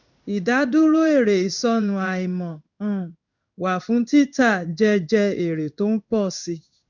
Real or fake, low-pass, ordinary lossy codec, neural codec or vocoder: fake; 7.2 kHz; none; codec, 16 kHz in and 24 kHz out, 1 kbps, XY-Tokenizer